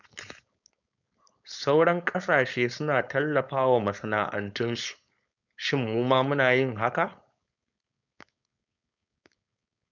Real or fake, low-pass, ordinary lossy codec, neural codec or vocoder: fake; 7.2 kHz; none; codec, 16 kHz, 4.8 kbps, FACodec